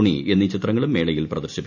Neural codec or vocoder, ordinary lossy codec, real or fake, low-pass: none; none; real; 7.2 kHz